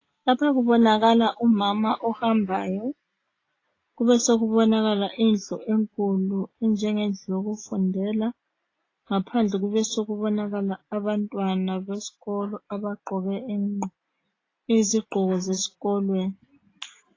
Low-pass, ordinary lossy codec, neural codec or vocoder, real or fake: 7.2 kHz; AAC, 32 kbps; none; real